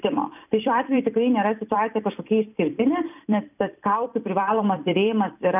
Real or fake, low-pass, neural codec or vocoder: fake; 3.6 kHz; vocoder, 44.1 kHz, 128 mel bands every 256 samples, BigVGAN v2